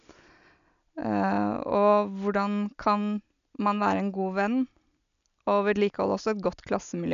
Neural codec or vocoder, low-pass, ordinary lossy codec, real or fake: none; 7.2 kHz; none; real